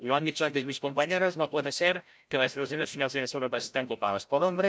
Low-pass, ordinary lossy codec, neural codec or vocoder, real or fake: none; none; codec, 16 kHz, 0.5 kbps, FreqCodec, larger model; fake